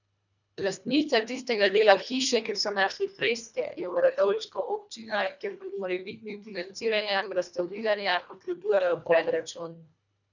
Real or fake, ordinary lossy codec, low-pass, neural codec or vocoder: fake; none; 7.2 kHz; codec, 24 kHz, 1.5 kbps, HILCodec